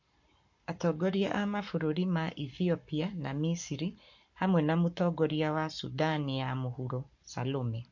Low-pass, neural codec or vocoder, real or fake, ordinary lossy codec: 7.2 kHz; codec, 44.1 kHz, 7.8 kbps, Pupu-Codec; fake; MP3, 48 kbps